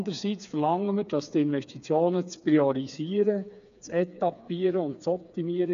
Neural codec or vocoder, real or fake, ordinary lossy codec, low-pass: codec, 16 kHz, 4 kbps, FreqCodec, smaller model; fake; AAC, 96 kbps; 7.2 kHz